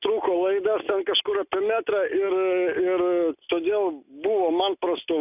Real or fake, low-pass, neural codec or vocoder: real; 3.6 kHz; none